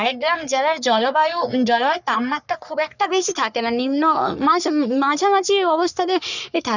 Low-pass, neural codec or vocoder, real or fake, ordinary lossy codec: 7.2 kHz; codec, 44.1 kHz, 3.4 kbps, Pupu-Codec; fake; none